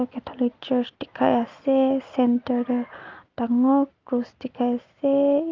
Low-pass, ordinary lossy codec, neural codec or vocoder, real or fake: 7.2 kHz; Opus, 32 kbps; none; real